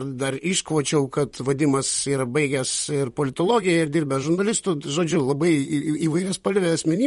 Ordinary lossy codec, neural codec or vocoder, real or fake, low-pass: MP3, 48 kbps; vocoder, 44.1 kHz, 128 mel bands, Pupu-Vocoder; fake; 19.8 kHz